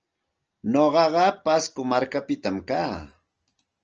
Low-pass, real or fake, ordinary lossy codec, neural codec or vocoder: 7.2 kHz; real; Opus, 24 kbps; none